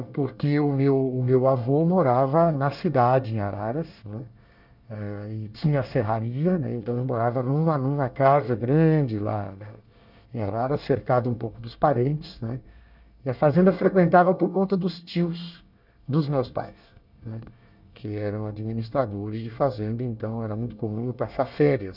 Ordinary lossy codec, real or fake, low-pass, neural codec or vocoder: none; fake; 5.4 kHz; codec, 24 kHz, 1 kbps, SNAC